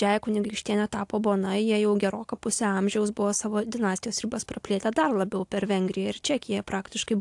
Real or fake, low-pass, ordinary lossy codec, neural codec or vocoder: real; 10.8 kHz; AAC, 64 kbps; none